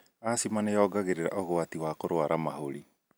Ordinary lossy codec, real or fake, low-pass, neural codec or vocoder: none; real; none; none